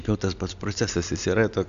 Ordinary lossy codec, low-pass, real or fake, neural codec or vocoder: AAC, 96 kbps; 7.2 kHz; real; none